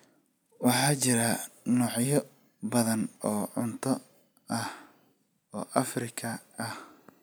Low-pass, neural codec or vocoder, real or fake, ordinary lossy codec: none; none; real; none